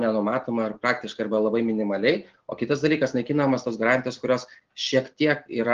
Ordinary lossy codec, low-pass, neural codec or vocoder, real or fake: Opus, 16 kbps; 7.2 kHz; none; real